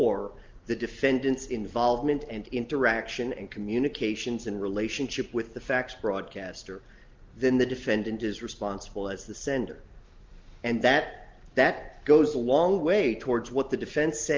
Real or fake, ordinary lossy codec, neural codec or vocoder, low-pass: real; Opus, 32 kbps; none; 7.2 kHz